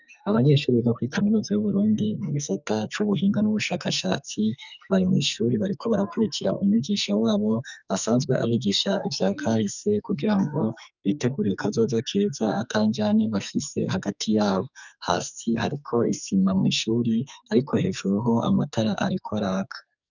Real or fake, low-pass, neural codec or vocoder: fake; 7.2 kHz; codec, 44.1 kHz, 2.6 kbps, SNAC